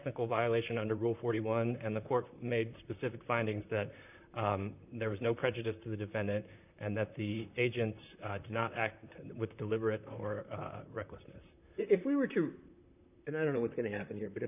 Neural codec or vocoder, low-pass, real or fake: vocoder, 44.1 kHz, 128 mel bands, Pupu-Vocoder; 3.6 kHz; fake